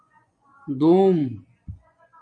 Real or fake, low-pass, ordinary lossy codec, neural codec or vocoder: fake; 9.9 kHz; MP3, 64 kbps; vocoder, 24 kHz, 100 mel bands, Vocos